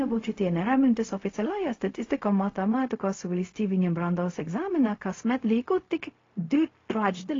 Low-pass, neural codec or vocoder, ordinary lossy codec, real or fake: 7.2 kHz; codec, 16 kHz, 0.4 kbps, LongCat-Audio-Codec; AAC, 32 kbps; fake